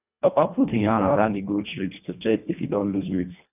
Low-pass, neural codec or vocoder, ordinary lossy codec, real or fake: 3.6 kHz; codec, 24 kHz, 1.5 kbps, HILCodec; none; fake